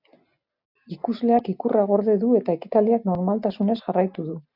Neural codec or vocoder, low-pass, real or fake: none; 5.4 kHz; real